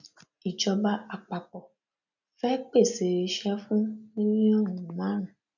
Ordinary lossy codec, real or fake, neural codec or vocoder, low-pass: none; real; none; 7.2 kHz